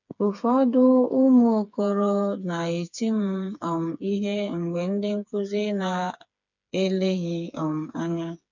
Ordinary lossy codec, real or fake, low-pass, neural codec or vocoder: none; fake; 7.2 kHz; codec, 16 kHz, 4 kbps, FreqCodec, smaller model